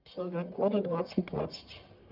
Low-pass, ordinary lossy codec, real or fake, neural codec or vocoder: 5.4 kHz; Opus, 24 kbps; fake; codec, 44.1 kHz, 1.7 kbps, Pupu-Codec